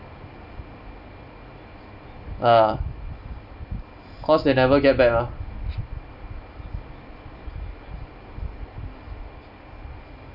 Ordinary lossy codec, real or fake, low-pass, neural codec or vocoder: none; real; 5.4 kHz; none